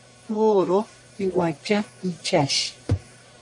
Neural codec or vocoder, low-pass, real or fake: codec, 44.1 kHz, 1.7 kbps, Pupu-Codec; 10.8 kHz; fake